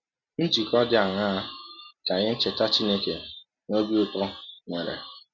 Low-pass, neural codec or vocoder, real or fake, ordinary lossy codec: 7.2 kHz; none; real; none